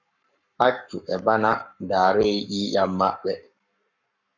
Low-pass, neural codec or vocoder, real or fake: 7.2 kHz; codec, 44.1 kHz, 7.8 kbps, Pupu-Codec; fake